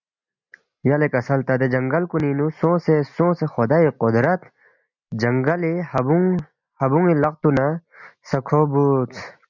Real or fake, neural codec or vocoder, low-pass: real; none; 7.2 kHz